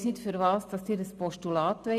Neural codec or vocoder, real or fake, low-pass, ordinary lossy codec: none; real; 14.4 kHz; none